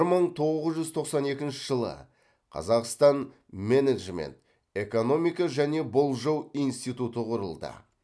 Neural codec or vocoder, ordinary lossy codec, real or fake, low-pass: none; none; real; none